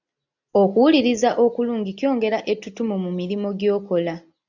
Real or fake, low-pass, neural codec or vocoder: real; 7.2 kHz; none